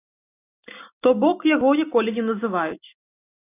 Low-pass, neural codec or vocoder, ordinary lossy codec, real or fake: 3.6 kHz; none; AAC, 24 kbps; real